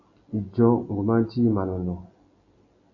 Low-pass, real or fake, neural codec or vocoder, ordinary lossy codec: 7.2 kHz; real; none; AAC, 48 kbps